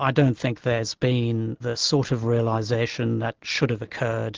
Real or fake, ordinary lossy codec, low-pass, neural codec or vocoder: real; Opus, 16 kbps; 7.2 kHz; none